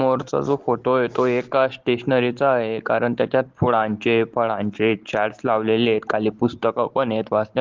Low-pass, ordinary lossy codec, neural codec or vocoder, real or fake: 7.2 kHz; Opus, 16 kbps; none; real